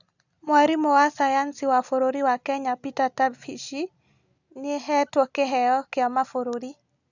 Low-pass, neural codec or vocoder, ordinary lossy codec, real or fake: 7.2 kHz; none; none; real